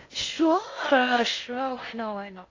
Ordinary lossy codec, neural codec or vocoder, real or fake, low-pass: AAC, 32 kbps; codec, 16 kHz in and 24 kHz out, 0.6 kbps, FocalCodec, streaming, 2048 codes; fake; 7.2 kHz